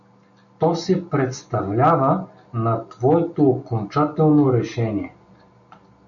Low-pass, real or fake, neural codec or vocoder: 7.2 kHz; real; none